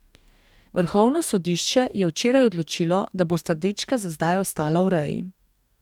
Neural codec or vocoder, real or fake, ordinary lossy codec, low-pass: codec, 44.1 kHz, 2.6 kbps, DAC; fake; none; 19.8 kHz